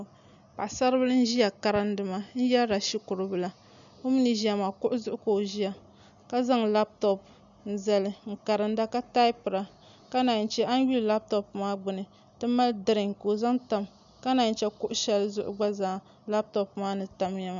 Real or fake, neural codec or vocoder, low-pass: real; none; 7.2 kHz